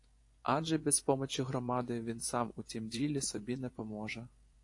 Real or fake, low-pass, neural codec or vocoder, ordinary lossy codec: fake; 10.8 kHz; vocoder, 24 kHz, 100 mel bands, Vocos; AAC, 48 kbps